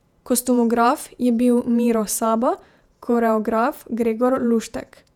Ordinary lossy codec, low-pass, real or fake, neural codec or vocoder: none; 19.8 kHz; fake; vocoder, 48 kHz, 128 mel bands, Vocos